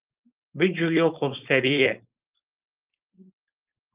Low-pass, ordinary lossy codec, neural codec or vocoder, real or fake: 3.6 kHz; Opus, 32 kbps; codec, 16 kHz, 4.8 kbps, FACodec; fake